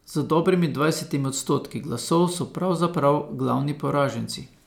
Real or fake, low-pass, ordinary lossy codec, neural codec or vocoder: real; none; none; none